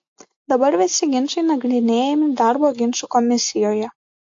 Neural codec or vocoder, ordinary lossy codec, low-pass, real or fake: none; AAC, 48 kbps; 7.2 kHz; real